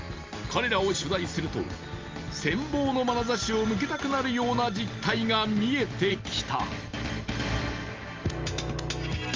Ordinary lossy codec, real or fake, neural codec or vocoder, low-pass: Opus, 32 kbps; real; none; 7.2 kHz